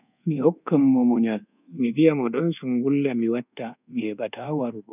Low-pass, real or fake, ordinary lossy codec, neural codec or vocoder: 3.6 kHz; fake; none; codec, 24 kHz, 1.2 kbps, DualCodec